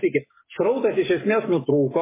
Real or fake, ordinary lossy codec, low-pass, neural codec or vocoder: fake; MP3, 16 kbps; 3.6 kHz; codec, 44.1 kHz, 7.8 kbps, Pupu-Codec